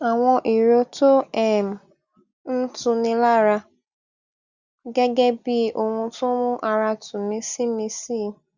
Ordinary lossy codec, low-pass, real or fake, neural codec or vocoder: Opus, 64 kbps; 7.2 kHz; real; none